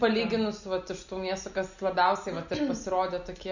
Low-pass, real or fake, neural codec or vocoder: 7.2 kHz; real; none